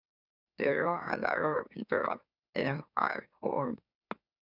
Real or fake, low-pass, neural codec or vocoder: fake; 5.4 kHz; autoencoder, 44.1 kHz, a latent of 192 numbers a frame, MeloTTS